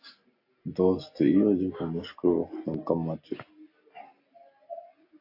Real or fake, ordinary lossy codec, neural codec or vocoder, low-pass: real; AAC, 32 kbps; none; 5.4 kHz